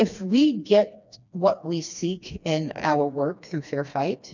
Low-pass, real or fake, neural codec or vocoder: 7.2 kHz; fake; codec, 16 kHz, 2 kbps, FreqCodec, smaller model